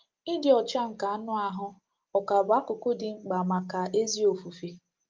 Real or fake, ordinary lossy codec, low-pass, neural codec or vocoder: real; Opus, 24 kbps; 7.2 kHz; none